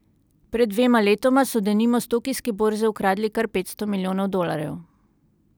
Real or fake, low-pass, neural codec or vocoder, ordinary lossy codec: real; none; none; none